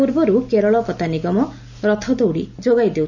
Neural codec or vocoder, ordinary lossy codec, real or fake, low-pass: none; none; real; 7.2 kHz